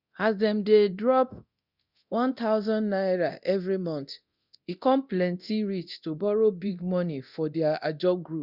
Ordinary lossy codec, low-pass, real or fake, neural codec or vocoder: Opus, 64 kbps; 5.4 kHz; fake; codec, 24 kHz, 0.9 kbps, DualCodec